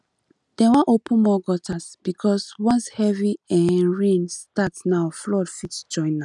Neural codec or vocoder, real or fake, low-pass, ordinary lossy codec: none; real; 10.8 kHz; none